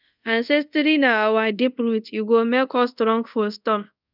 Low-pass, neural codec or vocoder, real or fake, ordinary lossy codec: 5.4 kHz; codec, 24 kHz, 0.5 kbps, DualCodec; fake; none